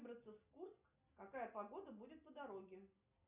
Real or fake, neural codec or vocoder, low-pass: real; none; 3.6 kHz